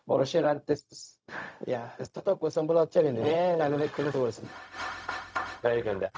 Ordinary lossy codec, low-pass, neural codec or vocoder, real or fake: none; none; codec, 16 kHz, 0.4 kbps, LongCat-Audio-Codec; fake